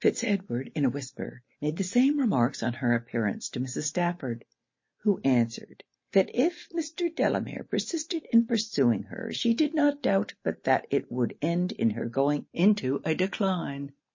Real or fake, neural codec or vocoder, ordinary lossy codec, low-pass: real; none; MP3, 32 kbps; 7.2 kHz